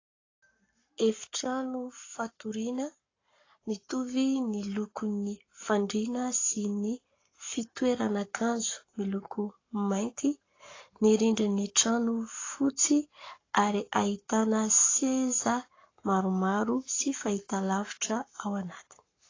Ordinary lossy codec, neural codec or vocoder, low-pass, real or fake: AAC, 32 kbps; codec, 44.1 kHz, 7.8 kbps, Pupu-Codec; 7.2 kHz; fake